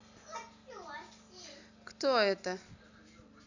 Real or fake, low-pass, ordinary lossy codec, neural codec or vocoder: real; 7.2 kHz; none; none